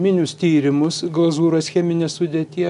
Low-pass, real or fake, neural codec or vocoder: 10.8 kHz; real; none